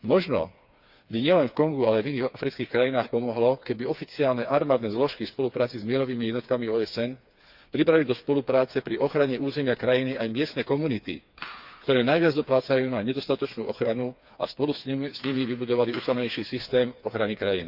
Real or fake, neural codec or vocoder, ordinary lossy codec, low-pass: fake; codec, 16 kHz, 4 kbps, FreqCodec, smaller model; Opus, 64 kbps; 5.4 kHz